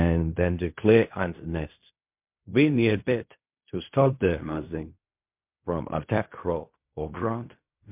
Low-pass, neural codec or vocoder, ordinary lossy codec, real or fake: 3.6 kHz; codec, 16 kHz in and 24 kHz out, 0.4 kbps, LongCat-Audio-Codec, fine tuned four codebook decoder; MP3, 32 kbps; fake